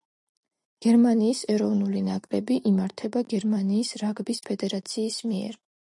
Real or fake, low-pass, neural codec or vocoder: real; 10.8 kHz; none